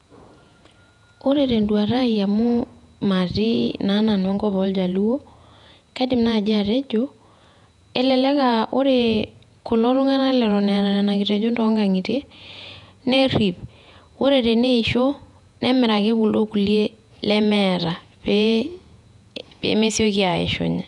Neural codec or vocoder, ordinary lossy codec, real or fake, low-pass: vocoder, 48 kHz, 128 mel bands, Vocos; none; fake; 10.8 kHz